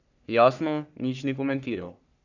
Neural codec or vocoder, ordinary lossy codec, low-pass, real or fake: codec, 44.1 kHz, 3.4 kbps, Pupu-Codec; none; 7.2 kHz; fake